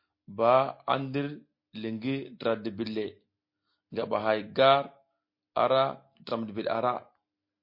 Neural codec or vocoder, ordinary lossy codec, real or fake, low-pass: none; MP3, 32 kbps; real; 5.4 kHz